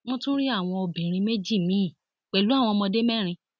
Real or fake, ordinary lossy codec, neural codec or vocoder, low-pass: real; none; none; none